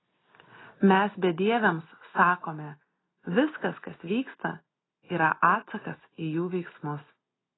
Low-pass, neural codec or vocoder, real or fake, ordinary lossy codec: 7.2 kHz; none; real; AAC, 16 kbps